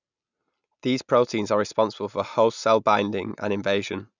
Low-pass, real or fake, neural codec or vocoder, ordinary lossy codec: 7.2 kHz; real; none; none